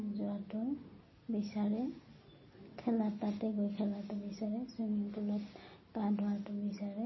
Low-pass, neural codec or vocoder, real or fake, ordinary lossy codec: 7.2 kHz; none; real; MP3, 24 kbps